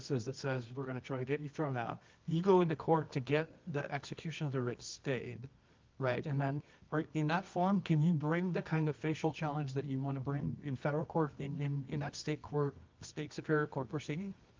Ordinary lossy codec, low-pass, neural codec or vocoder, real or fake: Opus, 24 kbps; 7.2 kHz; codec, 24 kHz, 0.9 kbps, WavTokenizer, medium music audio release; fake